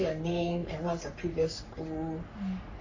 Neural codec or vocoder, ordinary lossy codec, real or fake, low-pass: codec, 44.1 kHz, 3.4 kbps, Pupu-Codec; AAC, 48 kbps; fake; 7.2 kHz